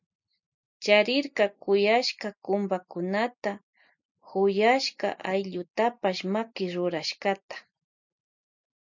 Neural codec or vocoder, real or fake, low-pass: none; real; 7.2 kHz